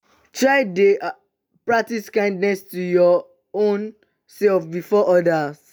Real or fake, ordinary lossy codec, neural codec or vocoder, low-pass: real; none; none; none